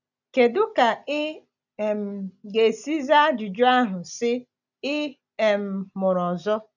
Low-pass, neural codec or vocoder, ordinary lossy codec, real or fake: 7.2 kHz; none; none; real